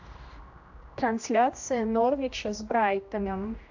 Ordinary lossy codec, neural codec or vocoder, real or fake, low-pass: AAC, 48 kbps; codec, 16 kHz, 1 kbps, X-Codec, HuBERT features, trained on general audio; fake; 7.2 kHz